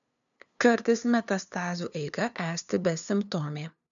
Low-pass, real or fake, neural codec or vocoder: 7.2 kHz; fake; codec, 16 kHz, 2 kbps, FunCodec, trained on LibriTTS, 25 frames a second